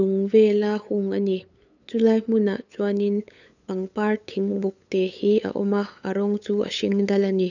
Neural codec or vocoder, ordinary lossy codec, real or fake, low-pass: codec, 16 kHz, 8 kbps, FunCodec, trained on LibriTTS, 25 frames a second; none; fake; 7.2 kHz